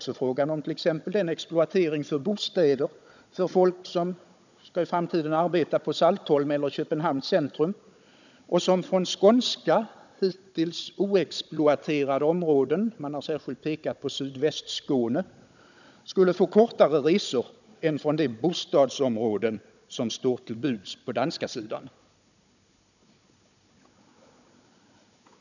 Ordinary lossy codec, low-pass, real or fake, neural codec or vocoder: none; 7.2 kHz; fake; codec, 16 kHz, 16 kbps, FunCodec, trained on Chinese and English, 50 frames a second